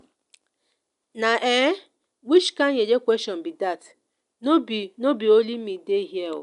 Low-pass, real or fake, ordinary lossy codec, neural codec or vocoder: 10.8 kHz; real; none; none